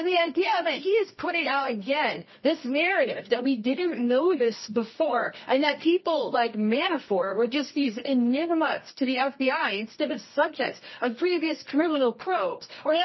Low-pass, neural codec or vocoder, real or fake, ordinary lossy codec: 7.2 kHz; codec, 24 kHz, 0.9 kbps, WavTokenizer, medium music audio release; fake; MP3, 24 kbps